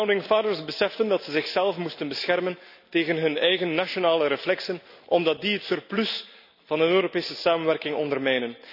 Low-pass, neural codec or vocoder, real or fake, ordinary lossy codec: 5.4 kHz; none; real; none